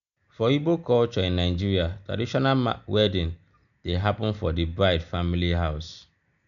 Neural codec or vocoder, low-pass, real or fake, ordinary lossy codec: none; 7.2 kHz; real; none